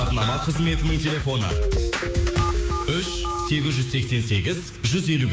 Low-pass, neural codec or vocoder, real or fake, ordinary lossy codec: none; codec, 16 kHz, 6 kbps, DAC; fake; none